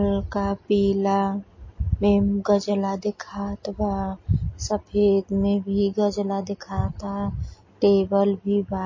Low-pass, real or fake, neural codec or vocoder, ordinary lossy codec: 7.2 kHz; real; none; MP3, 32 kbps